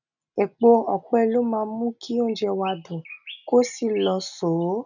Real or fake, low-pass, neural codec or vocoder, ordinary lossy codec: real; 7.2 kHz; none; none